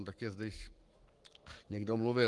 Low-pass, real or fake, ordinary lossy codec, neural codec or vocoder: 10.8 kHz; fake; Opus, 24 kbps; codec, 44.1 kHz, 7.8 kbps, Pupu-Codec